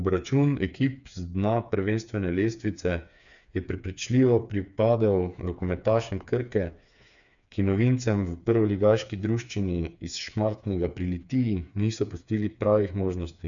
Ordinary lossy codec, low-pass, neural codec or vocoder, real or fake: none; 7.2 kHz; codec, 16 kHz, 4 kbps, FreqCodec, smaller model; fake